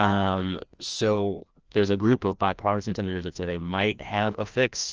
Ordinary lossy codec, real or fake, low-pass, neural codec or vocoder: Opus, 24 kbps; fake; 7.2 kHz; codec, 16 kHz, 1 kbps, FreqCodec, larger model